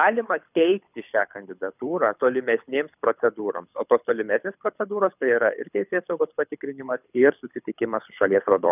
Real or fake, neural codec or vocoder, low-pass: fake; codec, 24 kHz, 6 kbps, HILCodec; 3.6 kHz